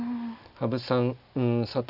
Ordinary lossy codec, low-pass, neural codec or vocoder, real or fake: none; 5.4 kHz; none; real